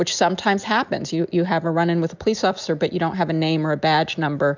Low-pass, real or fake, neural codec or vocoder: 7.2 kHz; real; none